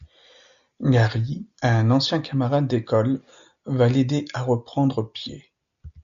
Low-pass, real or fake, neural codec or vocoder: 7.2 kHz; real; none